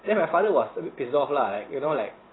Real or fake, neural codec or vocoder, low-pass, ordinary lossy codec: fake; vocoder, 44.1 kHz, 128 mel bands every 512 samples, BigVGAN v2; 7.2 kHz; AAC, 16 kbps